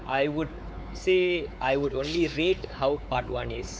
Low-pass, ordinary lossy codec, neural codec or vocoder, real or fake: none; none; codec, 16 kHz, 4 kbps, X-Codec, WavLM features, trained on Multilingual LibriSpeech; fake